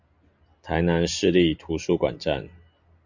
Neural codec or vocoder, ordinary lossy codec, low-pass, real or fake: none; Opus, 64 kbps; 7.2 kHz; real